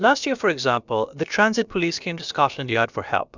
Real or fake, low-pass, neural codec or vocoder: fake; 7.2 kHz; codec, 16 kHz, about 1 kbps, DyCAST, with the encoder's durations